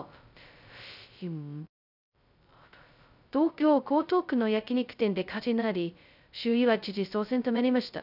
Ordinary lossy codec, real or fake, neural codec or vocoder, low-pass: none; fake; codec, 16 kHz, 0.2 kbps, FocalCodec; 5.4 kHz